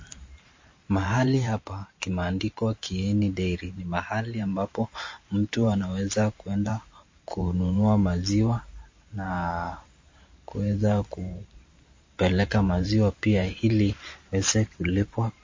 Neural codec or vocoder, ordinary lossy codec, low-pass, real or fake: vocoder, 44.1 kHz, 128 mel bands every 512 samples, BigVGAN v2; MP3, 32 kbps; 7.2 kHz; fake